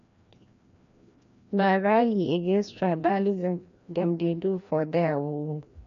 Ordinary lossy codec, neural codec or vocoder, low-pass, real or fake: MP3, 48 kbps; codec, 16 kHz, 1 kbps, FreqCodec, larger model; 7.2 kHz; fake